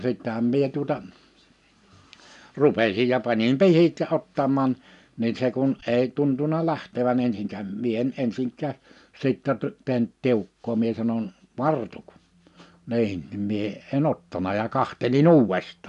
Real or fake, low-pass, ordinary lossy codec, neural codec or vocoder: real; 10.8 kHz; none; none